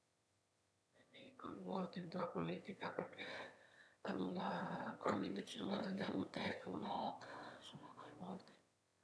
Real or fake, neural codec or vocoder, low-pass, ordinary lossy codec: fake; autoencoder, 22.05 kHz, a latent of 192 numbers a frame, VITS, trained on one speaker; none; none